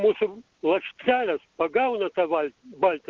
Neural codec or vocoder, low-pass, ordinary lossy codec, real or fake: none; 7.2 kHz; Opus, 16 kbps; real